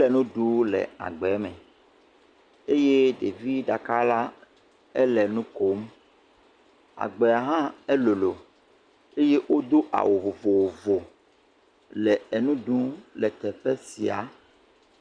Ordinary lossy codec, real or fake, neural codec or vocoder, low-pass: Opus, 64 kbps; real; none; 9.9 kHz